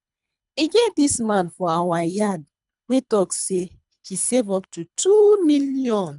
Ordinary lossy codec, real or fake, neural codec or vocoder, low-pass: none; fake; codec, 24 kHz, 3 kbps, HILCodec; 10.8 kHz